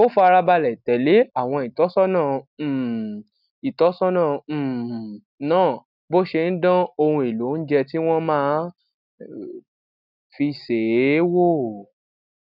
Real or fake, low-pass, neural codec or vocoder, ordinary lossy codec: real; 5.4 kHz; none; none